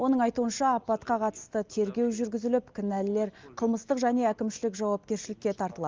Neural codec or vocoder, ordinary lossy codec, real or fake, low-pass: none; Opus, 32 kbps; real; 7.2 kHz